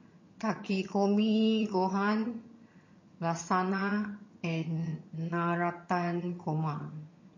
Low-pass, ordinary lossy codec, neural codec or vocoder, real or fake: 7.2 kHz; MP3, 32 kbps; vocoder, 22.05 kHz, 80 mel bands, HiFi-GAN; fake